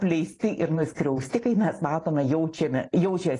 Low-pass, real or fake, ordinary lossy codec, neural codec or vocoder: 10.8 kHz; real; AAC, 32 kbps; none